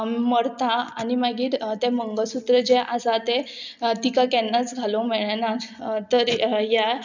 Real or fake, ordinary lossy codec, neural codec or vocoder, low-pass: real; none; none; 7.2 kHz